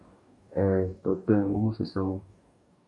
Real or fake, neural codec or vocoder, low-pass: fake; codec, 44.1 kHz, 2.6 kbps, DAC; 10.8 kHz